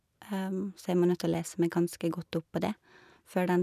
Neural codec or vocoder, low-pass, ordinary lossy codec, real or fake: none; 14.4 kHz; none; real